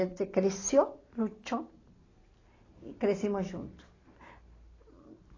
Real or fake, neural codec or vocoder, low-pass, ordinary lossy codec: real; none; 7.2 kHz; AAC, 32 kbps